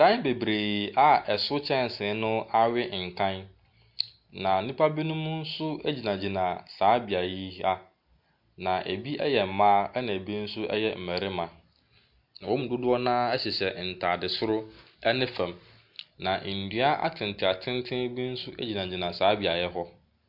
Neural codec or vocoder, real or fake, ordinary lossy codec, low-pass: none; real; MP3, 48 kbps; 5.4 kHz